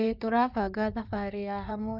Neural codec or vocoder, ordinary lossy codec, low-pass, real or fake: codec, 16 kHz in and 24 kHz out, 2.2 kbps, FireRedTTS-2 codec; none; 5.4 kHz; fake